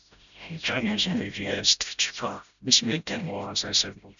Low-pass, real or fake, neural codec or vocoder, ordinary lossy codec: 7.2 kHz; fake; codec, 16 kHz, 0.5 kbps, FreqCodec, smaller model; none